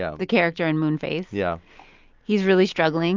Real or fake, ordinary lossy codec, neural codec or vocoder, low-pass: real; Opus, 32 kbps; none; 7.2 kHz